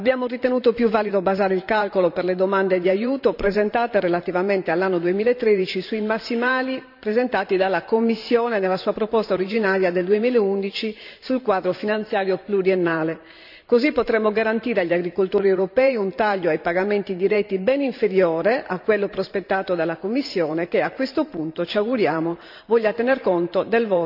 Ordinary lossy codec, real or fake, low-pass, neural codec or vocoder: none; fake; 5.4 kHz; vocoder, 44.1 kHz, 128 mel bands every 512 samples, BigVGAN v2